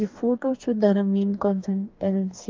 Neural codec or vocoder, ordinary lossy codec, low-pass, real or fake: codec, 44.1 kHz, 2.6 kbps, DAC; Opus, 24 kbps; 7.2 kHz; fake